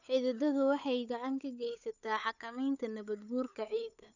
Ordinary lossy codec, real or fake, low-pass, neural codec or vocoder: none; fake; 7.2 kHz; codec, 16 kHz, 8 kbps, FunCodec, trained on Chinese and English, 25 frames a second